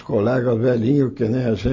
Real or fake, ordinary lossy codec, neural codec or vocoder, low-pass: real; MP3, 32 kbps; none; 7.2 kHz